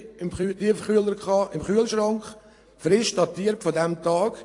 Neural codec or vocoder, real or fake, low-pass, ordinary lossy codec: vocoder, 44.1 kHz, 128 mel bands every 256 samples, BigVGAN v2; fake; 10.8 kHz; AAC, 48 kbps